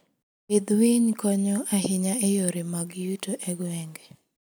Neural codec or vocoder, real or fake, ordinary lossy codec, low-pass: none; real; none; none